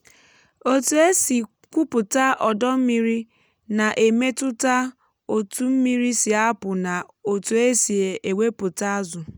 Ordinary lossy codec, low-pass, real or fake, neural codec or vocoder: none; none; real; none